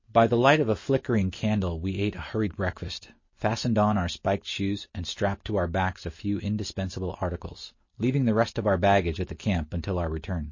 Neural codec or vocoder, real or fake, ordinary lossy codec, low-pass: none; real; MP3, 32 kbps; 7.2 kHz